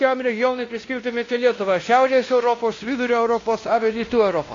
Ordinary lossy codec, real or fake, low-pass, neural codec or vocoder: AAC, 32 kbps; fake; 7.2 kHz; codec, 16 kHz, 1 kbps, X-Codec, WavLM features, trained on Multilingual LibriSpeech